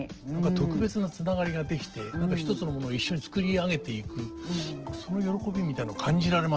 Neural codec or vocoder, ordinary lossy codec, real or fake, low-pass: none; Opus, 16 kbps; real; 7.2 kHz